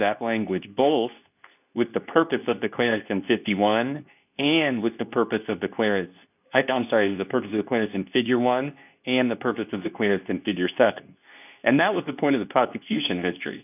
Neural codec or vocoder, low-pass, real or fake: codec, 24 kHz, 0.9 kbps, WavTokenizer, medium speech release version 2; 3.6 kHz; fake